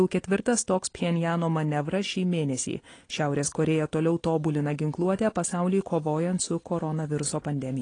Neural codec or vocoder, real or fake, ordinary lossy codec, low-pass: none; real; AAC, 32 kbps; 9.9 kHz